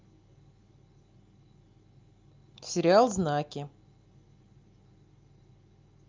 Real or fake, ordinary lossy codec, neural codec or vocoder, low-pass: real; Opus, 24 kbps; none; 7.2 kHz